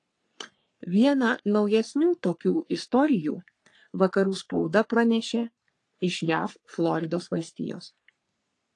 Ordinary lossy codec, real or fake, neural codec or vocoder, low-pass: AAC, 48 kbps; fake; codec, 44.1 kHz, 3.4 kbps, Pupu-Codec; 10.8 kHz